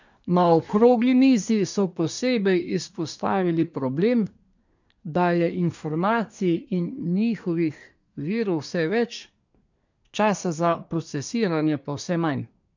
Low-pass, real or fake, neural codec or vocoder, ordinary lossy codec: 7.2 kHz; fake; codec, 24 kHz, 1 kbps, SNAC; none